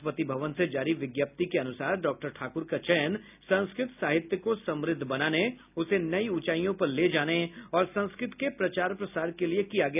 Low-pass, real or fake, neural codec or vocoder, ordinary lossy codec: 3.6 kHz; real; none; none